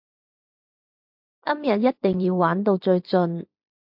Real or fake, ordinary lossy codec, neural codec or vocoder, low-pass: fake; MP3, 48 kbps; vocoder, 44.1 kHz, 128 mel bands every 512 samples, BigVGAN v2; 5.4 kHz